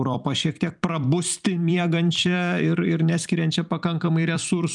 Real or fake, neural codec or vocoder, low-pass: fake; vocoder, 44.1 kHz, 128 mel bands every 256 samples, BigVGAN v2; 10.8 kHz